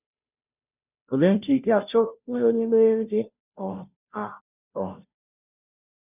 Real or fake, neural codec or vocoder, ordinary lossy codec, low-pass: fake; codec, 16 kHz, 0.5 kbps, FunCodec, trained on Chinese and English, 25 frames a second; none; 3.6 kHz